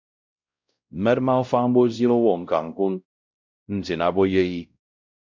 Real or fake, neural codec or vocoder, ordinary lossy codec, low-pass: fake; codec, 16 kHz, 0.5 kbps, X-Codec, WavLM features, trained on Multilingual LibriSpeech; MP3, 64 kbps; 7.2 kHz